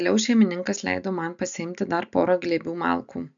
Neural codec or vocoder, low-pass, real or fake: none; 7.2 kHz; real